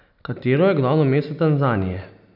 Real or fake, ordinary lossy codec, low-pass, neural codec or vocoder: real; none; 5.4 kHz; none